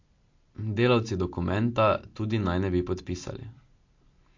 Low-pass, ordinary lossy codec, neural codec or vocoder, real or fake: 7.2 kHz; MP3, 48 kbps; none; real